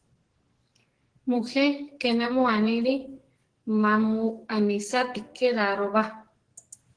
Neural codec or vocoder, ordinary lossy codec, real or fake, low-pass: codec, 44.1 kHz, 2.6 kbps, SNAC; Opus, 16 kbps; fake; 9.9 kHz